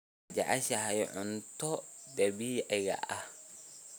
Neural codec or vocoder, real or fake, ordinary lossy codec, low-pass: none; real; none; none